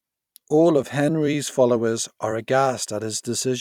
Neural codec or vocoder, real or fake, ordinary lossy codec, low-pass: vocoder, 44.1 kHz, 128 mel bands every 256 samples, BigVGAN v2; fake; none; 19.8 kHz